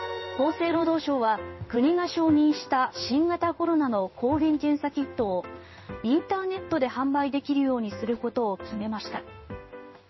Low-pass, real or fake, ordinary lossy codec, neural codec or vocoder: 7.2 kHz; fake; MP3, 24 kbps; codec, 16 kHz in and 24 kHz out, 1 kbps, XY-Tokenizer